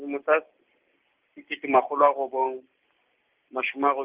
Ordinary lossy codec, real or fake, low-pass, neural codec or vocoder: Opus, 32 kbps; real; 3.6 kHz; none